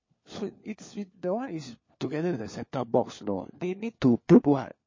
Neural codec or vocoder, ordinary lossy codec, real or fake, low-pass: codec, 16 kHz, 4 kbps, FunCodec, trained on LibriTTS, 50 frames a second; MP3, 32 kbps; fake; 7.2 kHz